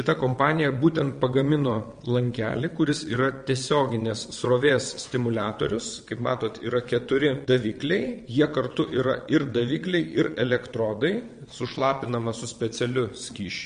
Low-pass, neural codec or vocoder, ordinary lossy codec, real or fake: 9.9 kHz; vocoder, 22.05 kHz, 80 mel bands, WaveNeXt; MP3, 48 kbps; fake